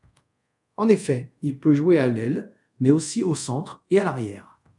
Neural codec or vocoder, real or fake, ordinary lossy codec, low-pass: codec, 24 kHz, 0.5 kbps, DualCodec; fake; MP3, 96 kbps; 10.8 kHz